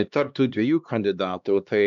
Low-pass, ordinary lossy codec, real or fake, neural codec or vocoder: 7.2 kHz; MP3, 64 kbps; fake; codec, 16 kHz, 2 kbps, X-Codec, HuBERT features, trained on LibriSpeech